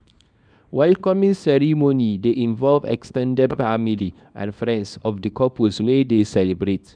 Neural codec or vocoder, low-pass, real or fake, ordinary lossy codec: codec, 24 kHz, 0.9 kbps, WavTokenizer, small release; 9.9 kHz; fake; none